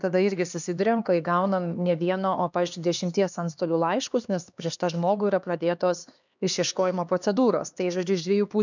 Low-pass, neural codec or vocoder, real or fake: 7.2 kHz; codec, 16 kHz, 2 kbps, X-Codec, HuBERT features, trained on LibriSpeech; fake